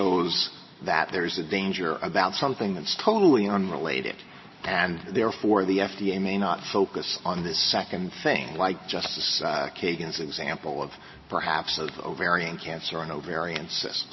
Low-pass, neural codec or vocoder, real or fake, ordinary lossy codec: 7.2 kHz; none; real; MP3, 24 kbps